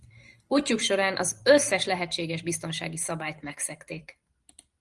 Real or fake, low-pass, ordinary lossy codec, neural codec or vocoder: fake; 10.8 kHz; Opus, 24 kbps; vocoder, 44.1 kHz, 128 mel bands every 512 samples, BigVGAN v2